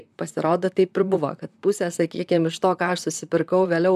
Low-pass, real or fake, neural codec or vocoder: 14.4 kHz; fake; vocoder, 44.1 kHz, 128 mel bands, Pupu-Vocoder